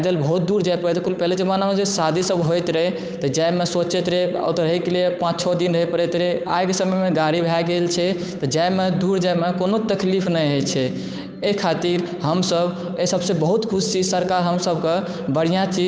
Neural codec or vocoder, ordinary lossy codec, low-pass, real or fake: codec, 16 kHz, 8 kbps, FunCodec, trained on Chinese and English, 25 frames a second; none; none; fake